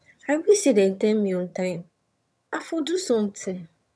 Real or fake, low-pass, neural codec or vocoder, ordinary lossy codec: fake; none; vocoder, 22.05 kHz, 80 mel bands, HiFi-GAN; none